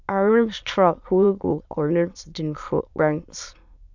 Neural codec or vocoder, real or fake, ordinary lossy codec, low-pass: autoencoder, 22.05 kHz, a latent of 192 numbers a frame, VITS, trained on many speakers; fake; none; 7.2 kHz